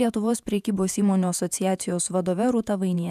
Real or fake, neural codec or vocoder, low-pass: fake; vocoder, 48 kHz, 128 mel bands, Vocos; 14.4 kHz